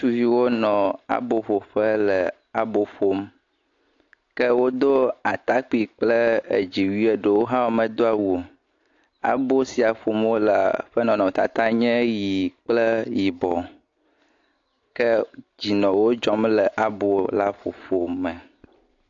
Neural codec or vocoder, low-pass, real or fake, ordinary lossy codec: none; 7.2 kHz; real; AAC, 48 kbps